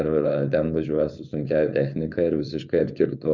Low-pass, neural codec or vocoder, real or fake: 7.2 kHz; codec, 16 kHz, 4.8 kbps, FACodec; fake